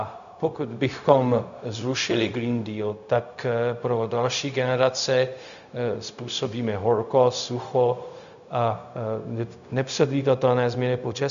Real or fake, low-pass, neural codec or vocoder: fake; 7.2 kHz; codec, 16 kHz, 0.4 kbps, LongCat-Audio-Codec